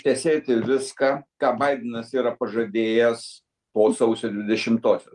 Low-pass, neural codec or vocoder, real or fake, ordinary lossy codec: 10.8 kHz; none; real; Opus, 32 kbps